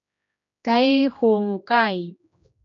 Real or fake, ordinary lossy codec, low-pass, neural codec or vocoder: fake; MP3, 64 kbps; 7.2 kHz; codec, 16 kHz, 1 kbps, X-Codec, HuBERT features, trained on general audio